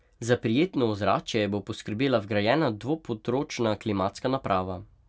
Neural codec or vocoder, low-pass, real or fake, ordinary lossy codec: none; none; real; none